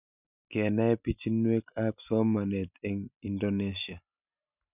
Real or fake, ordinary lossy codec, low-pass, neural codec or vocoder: real; none; 3.6 kHz; none